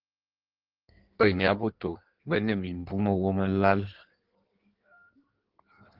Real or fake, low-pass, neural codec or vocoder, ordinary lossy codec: fake; 5.4 kHz; codec, 16 kHz in and 24 kHz out, 1.1 kbps, FireRedTTS-2 codec; Opus, 16 kbps